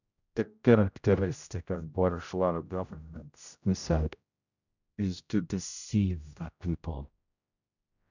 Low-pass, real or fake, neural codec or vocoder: 7.2 kHz; fake; codec, 16 kHz, 0.5 kbps, X-Codec, HuBERT features, trained on general audio